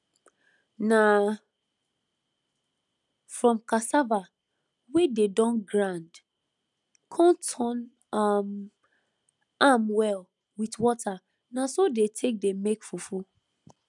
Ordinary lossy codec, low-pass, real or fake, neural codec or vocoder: none; 10.8 kHz; real; none